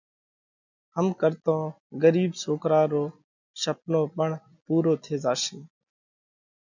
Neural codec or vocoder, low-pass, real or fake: none; 7.2 kHz; real